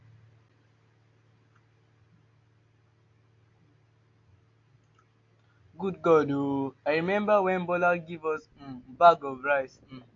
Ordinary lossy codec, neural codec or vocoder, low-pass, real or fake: AAC, 48 kbps; none; 7.2 kHz; real